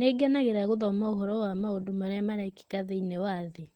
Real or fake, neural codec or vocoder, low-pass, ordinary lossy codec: real; none; 19.8 kHz; Opus, 16 kbps